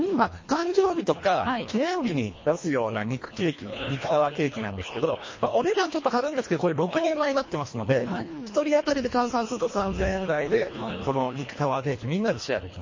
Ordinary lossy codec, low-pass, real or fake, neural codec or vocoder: MP3, 32 kbps; 7.2 kHz; fake; codec, 24 kHz, 1.5 kbps, HILCodec